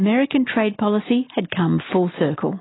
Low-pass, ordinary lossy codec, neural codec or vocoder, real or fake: 7.2 kHz; AAC, 16 kbps; none; real